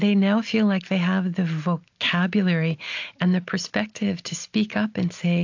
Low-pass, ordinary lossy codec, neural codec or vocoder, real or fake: 7.2 kHz; AAC, 48 kbps; none; real